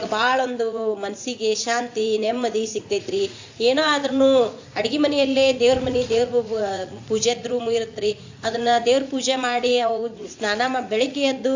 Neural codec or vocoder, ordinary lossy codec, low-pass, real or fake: vocoder, 44.1 kHz, 80 mel bands, Vocos; AAC, 48 kbps; 7.2 kHz; fake